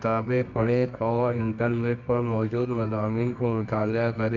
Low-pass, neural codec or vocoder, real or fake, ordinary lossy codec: 7.2 kHz; codec, 24 kHz, 0.9 kbps, WavTokenizer, medium music audio release; fake; none